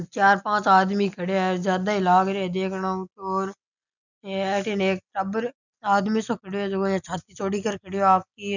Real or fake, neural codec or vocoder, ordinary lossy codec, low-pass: real; none; none; 7.2 kHz